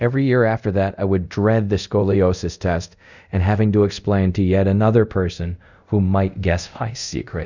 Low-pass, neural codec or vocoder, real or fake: 7.2 kHz; codec, 24 kHz, 0.5 kbps, DualCodec; fake